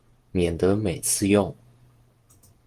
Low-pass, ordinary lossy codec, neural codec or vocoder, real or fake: 14.4 kHz; Opus, 24 kbps; vocoder, 48 kHz, 128 mel bands, Vocos; fake